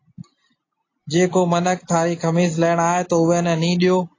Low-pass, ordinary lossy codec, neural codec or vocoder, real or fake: 7.2 kHz; AAC, 32 kbps; none; real